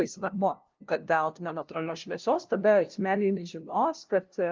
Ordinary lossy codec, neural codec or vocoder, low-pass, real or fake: Opus, 32 kbps; codec, 16 kHz, 0.5 kbps, FunCodec, trained on LibriTTS, 25 frames a second; 7.2 kHz; fake